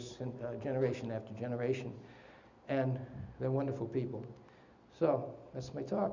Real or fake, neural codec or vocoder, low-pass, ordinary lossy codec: real; none; 7.2 kHz; Opus, 64 kbps